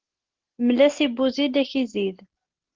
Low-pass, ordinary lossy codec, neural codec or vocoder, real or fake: 7.2 kHz; Opus, 16 kbps; none; real